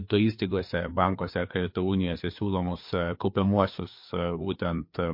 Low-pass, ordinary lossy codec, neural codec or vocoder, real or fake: 5.4 kHz; MP3, 32 kbps; codec, 16 kHz, 4 kbps, FreqCodec, larger model; fake